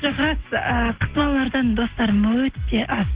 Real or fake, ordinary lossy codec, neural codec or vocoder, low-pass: real; Opus, 16 kbps; none; 3.6 kHz